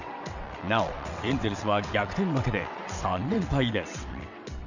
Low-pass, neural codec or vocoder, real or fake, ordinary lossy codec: 7.2 kHz; vocoder, 22.05 kHz, 80 mel bands, WaveNeXt; fake; none